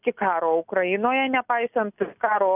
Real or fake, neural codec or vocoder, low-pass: real; none; 3.6 kHz